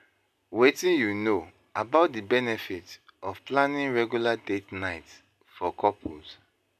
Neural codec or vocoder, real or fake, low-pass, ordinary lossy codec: none; real; 14.4 kHz; none